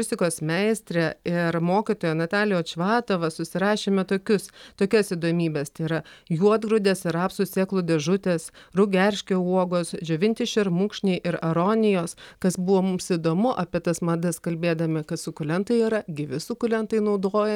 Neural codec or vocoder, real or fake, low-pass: none; real; 19.8 kHz